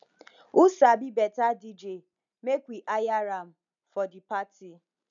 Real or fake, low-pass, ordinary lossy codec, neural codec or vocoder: real; 7.2 kHz; none; none